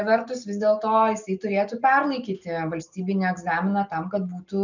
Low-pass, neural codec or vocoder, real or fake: 7.2 kHz; none; real